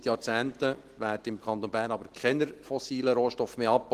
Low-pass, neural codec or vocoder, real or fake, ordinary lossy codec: 14.4 kHz; none; real; Opus, 16 kbps